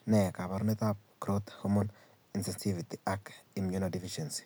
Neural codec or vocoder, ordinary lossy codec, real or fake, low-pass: none; none; real; none